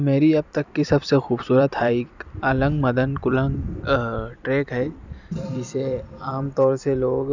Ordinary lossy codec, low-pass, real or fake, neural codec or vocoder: none; 7.2 kHz; fake; vocoder, 44.1 kHz, 128 mel bands every 256 samples, BigVGAN v2